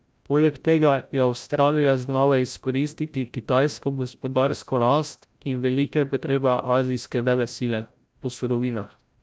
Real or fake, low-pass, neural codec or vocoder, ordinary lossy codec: fake; none; codec, 16 kHz, 0.5 kbps, FreqCodec, larger model; none